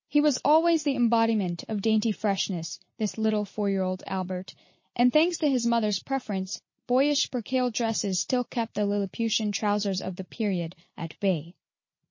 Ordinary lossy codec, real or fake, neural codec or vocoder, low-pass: MP3, 32 kbps; real; none; 7.2 kHz